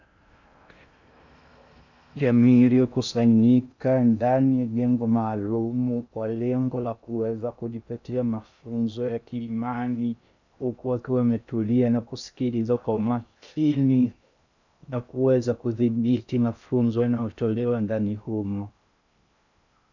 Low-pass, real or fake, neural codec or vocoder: 7.2 kHz; fake; codec, 16 kHz in and 24 kHz out, 0.6 kbps, FocalCodec, streaming, 2048 codes